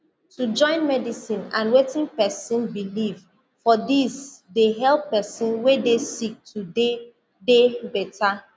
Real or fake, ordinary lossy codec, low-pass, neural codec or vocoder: real; none; none; none